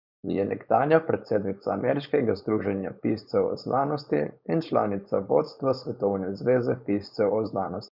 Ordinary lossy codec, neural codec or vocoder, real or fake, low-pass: Opus, 64 kbps; codec, 16 kHz, 4.8 kbps, FACodec; fake; 5.4 kHz